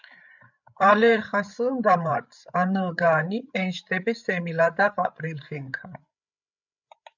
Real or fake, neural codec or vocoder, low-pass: fake; codec, 16 kHz, 8 kbps, FreqCodec, larger model; 7.2 kHz